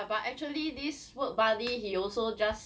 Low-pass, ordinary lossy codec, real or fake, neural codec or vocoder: none; none; real; none